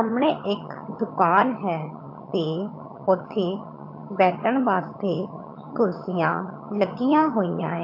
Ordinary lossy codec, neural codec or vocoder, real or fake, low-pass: MP3, 32 kbps; vocoder, 22.05 kHz, 80 mel bands, HiFi-GAN; fake; 5.4 kHz